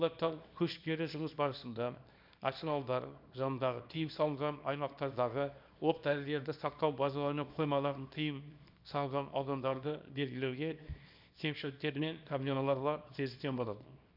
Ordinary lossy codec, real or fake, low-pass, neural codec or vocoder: none; fake; 5.4 kHz; codec, 24 kHz, 0.9 kbps, WavTokenizer, small release